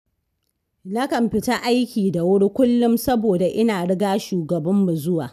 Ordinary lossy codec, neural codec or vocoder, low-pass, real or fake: none; none; 14.4 kHz; real